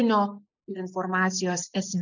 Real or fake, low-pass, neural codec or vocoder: real; 7.2 kHz; none